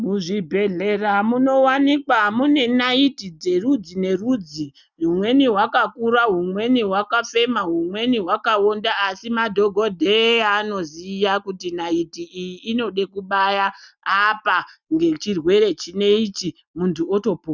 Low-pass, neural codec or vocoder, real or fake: 7.2 kHz; none; real